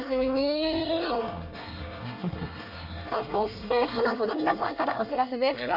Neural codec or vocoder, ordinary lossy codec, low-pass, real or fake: codec, 24 kHz, 1 kbps, SNAC; none; 5.4 kHz; fake